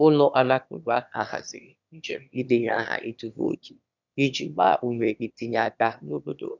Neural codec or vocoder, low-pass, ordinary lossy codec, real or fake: autoencoder, 22.05 kHz, a latent of 192 numbers a frame, VITS, trained on one speaker; 7.2 kHz; none; fake